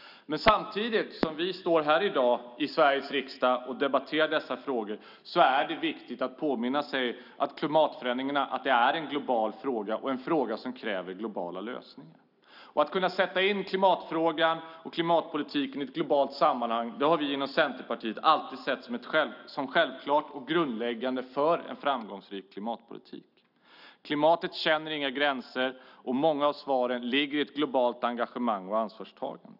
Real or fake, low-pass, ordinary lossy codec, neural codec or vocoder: real; 5.4 kHz; none; none